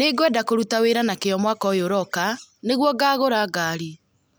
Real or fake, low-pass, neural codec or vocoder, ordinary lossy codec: real; none; none; none